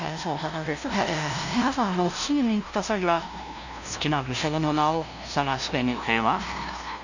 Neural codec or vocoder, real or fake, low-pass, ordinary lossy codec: codec, 16 kHz, 0.5 kbps, FunCodec, trained on LibriTTS, 25 frames a second; fake; 7.2 kHz; none